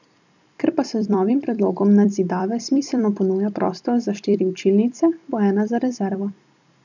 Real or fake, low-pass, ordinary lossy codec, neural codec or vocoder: fake; 7.2 kHz; none; vocoder, 44.1 kHz, 128 mel bands every 256 samples, BigVGAN v2